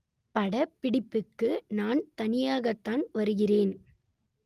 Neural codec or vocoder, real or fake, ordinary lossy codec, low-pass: none; real; Opus, 32 kbps; 14.4 kHz